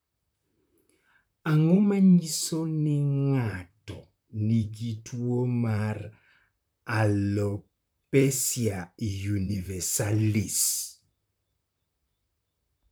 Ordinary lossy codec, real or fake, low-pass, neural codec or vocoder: none; fake; none; vocoder, 44.1 kHz, 128 mel bands, Pupu-Vocoder